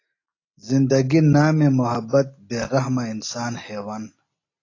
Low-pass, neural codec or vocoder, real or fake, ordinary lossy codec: 7.2 kHz; none; real; AAC, 32 kbps